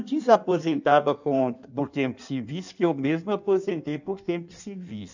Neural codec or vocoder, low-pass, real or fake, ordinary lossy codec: codec, 16 kHz in and 24 kHz out, 1.1 kbps, FireRedTTS-2 codec; 7.2 kHz; fake; none